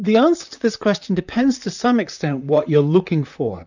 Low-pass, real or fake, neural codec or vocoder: 7.2 kHz; fake; vocoder, 44.1 kHz, 128 mel bands, Pupu-Vocoder